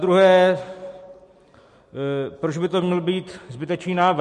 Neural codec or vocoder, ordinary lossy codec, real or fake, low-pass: none; MP3, 48 kbps; real; 14.4 kHz